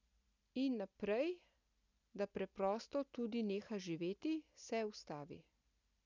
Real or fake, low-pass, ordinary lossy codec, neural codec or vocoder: real; 7.2 kHz; none; none